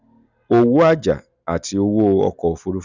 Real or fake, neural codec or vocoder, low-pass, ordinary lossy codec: real; none; 7.2 kHz; none